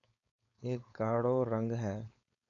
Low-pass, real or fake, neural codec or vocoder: 7.2 kHz; fake; codec, 16 kHz, 4.8 kbps, FACodec